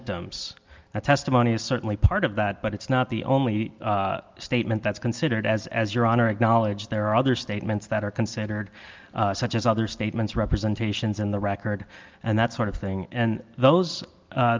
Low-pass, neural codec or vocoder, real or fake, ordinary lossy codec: 7.2 kHz; none; real; Opus, 24 kbps